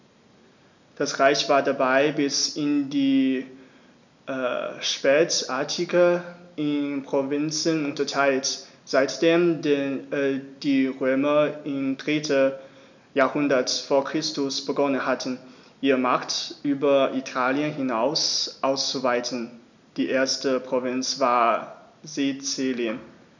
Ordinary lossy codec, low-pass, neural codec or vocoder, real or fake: none; 7.2 kHz; none; real